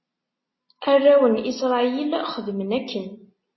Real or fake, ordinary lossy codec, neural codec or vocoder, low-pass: real; MP3, 24 kbps; none; 7.2 kHz